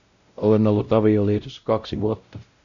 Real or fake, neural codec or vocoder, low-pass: fake; codec, 16 kHz, 0.5 kbps, X-Codec, WavLM features, trained on Multilingual LibriSpeech; 7.2 kHz